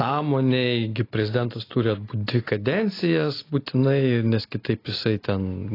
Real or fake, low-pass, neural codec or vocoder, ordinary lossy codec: real; 5.4 kHz; none; AAC, 24 kbps